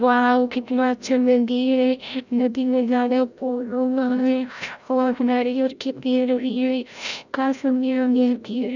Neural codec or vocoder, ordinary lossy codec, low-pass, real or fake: codec, 16 kHz, 0.5 kbps, FreqCodec, larger model; none; 7.2 kHz; fake